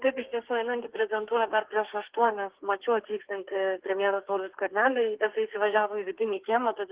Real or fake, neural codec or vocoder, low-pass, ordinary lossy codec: fake; codec, 32 kHz, 1.9 kbps, SNAC; 3.6 kHz; Opus, 16 kbps